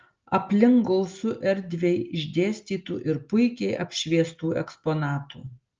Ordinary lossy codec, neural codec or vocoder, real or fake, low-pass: Opus, 24 kbps; none; real; 7.2 kHz